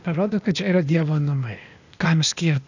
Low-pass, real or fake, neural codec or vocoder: 7.2 kHz; fake; codec, 16 kHz, 0.8 kbps, ZipCodec